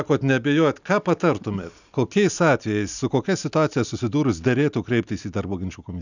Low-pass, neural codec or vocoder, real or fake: 7.2 kHz; none; real